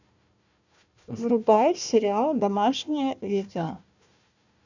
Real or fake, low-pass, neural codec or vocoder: fake; 7.2 kHz; codec, 16 kHz, 1 kbps, FunCodec, trained on Chinese and English, 50 frames a second